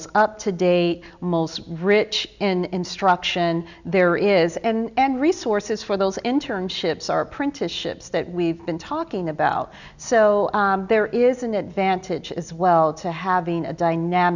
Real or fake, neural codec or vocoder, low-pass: real; none; 7.2 kHz